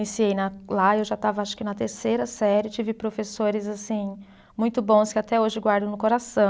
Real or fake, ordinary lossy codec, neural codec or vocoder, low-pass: real; none; none; none